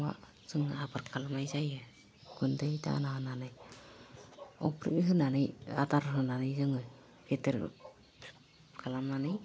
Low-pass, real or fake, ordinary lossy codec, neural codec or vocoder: none; real; none; none